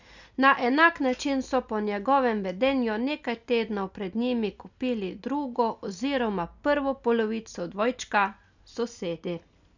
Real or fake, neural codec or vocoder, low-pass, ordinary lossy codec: real; none; 7.2 kHz; none